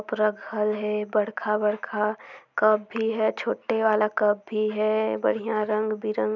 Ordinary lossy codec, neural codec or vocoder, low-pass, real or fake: none; none; 7.2 kHz; real